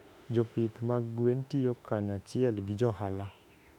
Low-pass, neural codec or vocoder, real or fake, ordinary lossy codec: 19.8 kHz; autoencoder, 48 kHz, 32 numbers a frame, DAC-VAE, trained on Japanese speech; fake; none